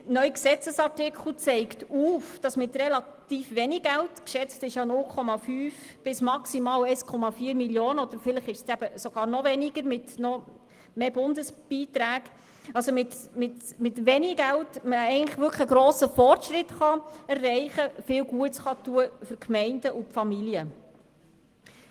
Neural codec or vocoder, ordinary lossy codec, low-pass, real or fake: none; Opus, 24 kbps; 14.4 kHz; real